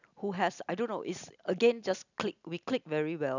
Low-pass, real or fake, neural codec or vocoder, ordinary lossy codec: 7.2 kHz; real; none; none